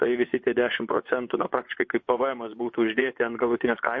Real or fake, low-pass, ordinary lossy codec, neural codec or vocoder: fake; 7.2 kHz; MP3, 32 kbps; vocoder, 24 kHz, 100 mel bands, Vocos